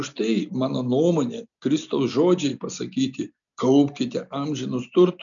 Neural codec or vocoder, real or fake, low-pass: none; real; 7.2 kHz